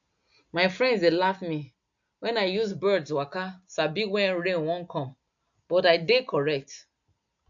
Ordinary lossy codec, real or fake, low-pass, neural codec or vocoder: MP3, 64 kbps; real; 7.2 kHz; none